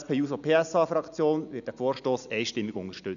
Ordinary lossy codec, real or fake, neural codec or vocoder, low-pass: none; real; none; 7.2 kHz